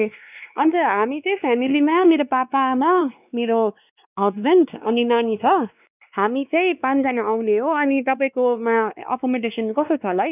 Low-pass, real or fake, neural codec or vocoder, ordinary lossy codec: 3.6 kHz; fake; codec, 16 kHz, 2 kbps, X-Codec, WavLM features, trained on Multilingual LibriSpeech; none